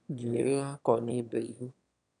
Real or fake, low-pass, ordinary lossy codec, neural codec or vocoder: fake; 9.9 kHz; none; autoencoder, 22.05 kHz, a latent of 192 numbers a frame, VITS, trained on one speaker